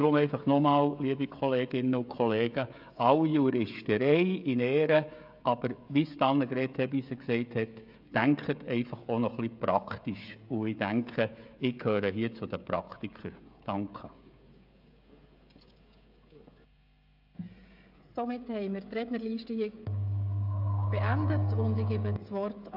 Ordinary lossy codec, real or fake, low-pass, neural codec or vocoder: AAC, 48 kbps; fake; 5.4 kHz; codec, 16 kHz, 16 kbps, FreqCodec, smaller model